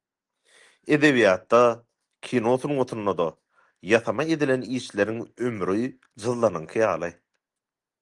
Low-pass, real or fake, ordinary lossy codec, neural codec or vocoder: 10.8 kHz; real; Opus, 24 kbps; none